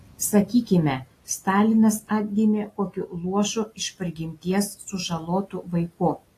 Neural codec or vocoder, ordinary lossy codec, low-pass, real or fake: none; AAC, 48 kbps; 14.4 kHz; real